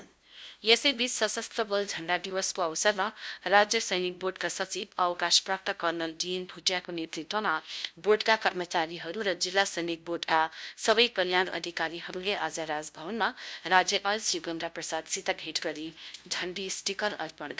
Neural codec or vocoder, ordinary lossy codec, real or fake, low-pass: codec, 16 kHz, 0.5 kbps, FunCodec, trained on LibriTTS, 25 frames a second; none; fake; none